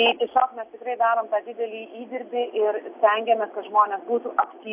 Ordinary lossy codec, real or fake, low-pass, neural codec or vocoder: AAC, 32 kbps; real; 3.6 kHz; none